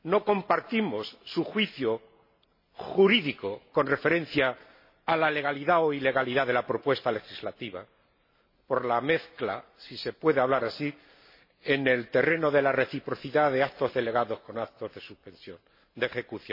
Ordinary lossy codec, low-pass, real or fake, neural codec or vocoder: MP3, 24 kbps; 5.4 kHz; real; none